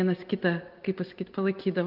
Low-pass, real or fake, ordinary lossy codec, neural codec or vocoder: 5.4 kHz; real; Opus, 32 kbps; none